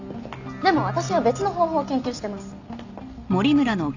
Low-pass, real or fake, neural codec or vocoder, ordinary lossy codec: 7.2 kHz; real; none; none